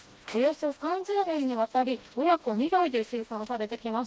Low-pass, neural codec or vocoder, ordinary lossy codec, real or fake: none; codec, 16 kHz, 1 kbps, FreqCodec, smaller model; none; fake